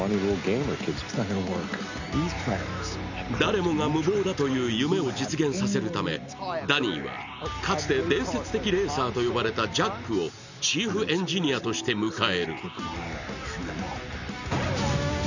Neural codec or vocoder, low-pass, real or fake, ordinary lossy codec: none; 7.2 kHz; real; none